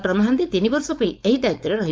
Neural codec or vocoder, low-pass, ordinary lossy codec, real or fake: codec, 16 kHz, 4.8 kbps, FACodec; none; none; fake